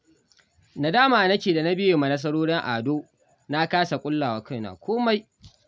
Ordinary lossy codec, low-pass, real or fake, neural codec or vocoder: none; none; real; none